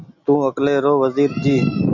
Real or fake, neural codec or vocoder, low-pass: real; none; 7.2 kHz